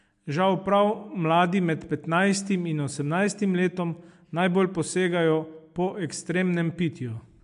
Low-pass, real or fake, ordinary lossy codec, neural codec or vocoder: 10.8 kHz; real; MP3, 64 kbps; none